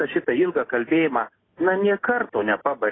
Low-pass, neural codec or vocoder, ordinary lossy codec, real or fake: 7.2 kHz; none; AAC, 16 kbps; real